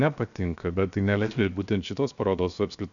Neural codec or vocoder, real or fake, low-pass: codec, 16 kHz, 0.7 kbps, FocalCodec; fake; 7.2 kHz